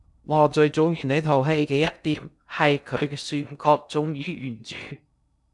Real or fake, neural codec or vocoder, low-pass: fake; codec, 16 kHz in and 24 kHz out, 0.6 kbps, FocalCodec, streaming, 2048 codes; 10.8 kHz